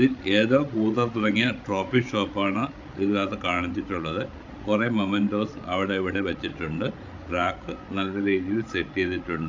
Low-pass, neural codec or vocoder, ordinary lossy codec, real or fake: 7.2 kHz; codec, 16 kHz, 16 kbps, FreqCodec, larger model; AAC, 48 kbps; fake